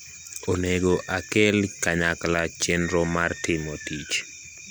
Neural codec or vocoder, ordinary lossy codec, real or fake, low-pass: none; none; real; none